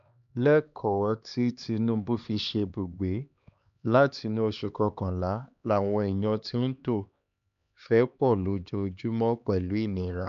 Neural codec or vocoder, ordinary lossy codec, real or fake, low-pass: codec, 16 kHz, 2 kbps, X-Codec, HuBERT features, trained on LibriSpeech; none; fake; 7.2 kHz